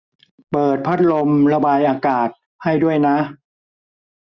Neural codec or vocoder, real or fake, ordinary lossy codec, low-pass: none; real; Opus, 64 kbps; 7.2 kHz